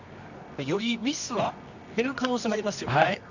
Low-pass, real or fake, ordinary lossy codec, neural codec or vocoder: 7.2 kHz; fake; none; codec, 24 kHz, 0.9 kbps, WavTokenizer, medium music audio release